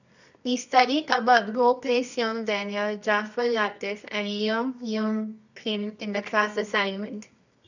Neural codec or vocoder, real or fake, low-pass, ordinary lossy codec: codec, 24 kHz, 0.9 kbps, WavTokenizer, medium music audio release; fake; 7.2 kHz; none